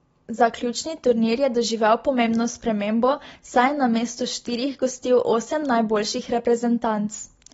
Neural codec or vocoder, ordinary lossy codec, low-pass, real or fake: none; AAC, 24 kbps; 19.8 kHz; real